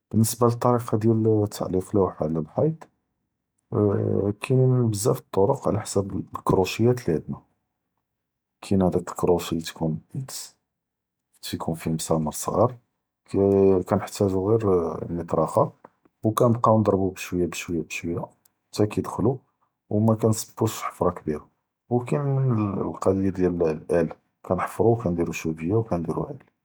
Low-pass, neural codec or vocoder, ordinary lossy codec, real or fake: none; none; none; real